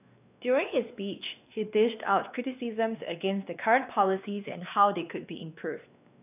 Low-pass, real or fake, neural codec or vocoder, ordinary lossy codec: 3.6 kHz; fake; codec, 16 kHz, 2 kbps, X-Codec, WavLM features, trained on Multilingual LibriSpeech; none